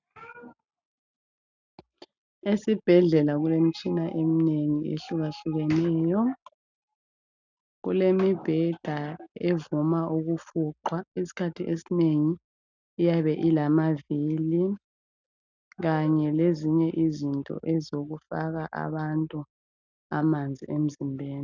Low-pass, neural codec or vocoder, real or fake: 7.2 kHz; none; real